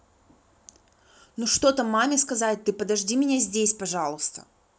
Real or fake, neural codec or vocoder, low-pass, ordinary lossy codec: real; none; none; none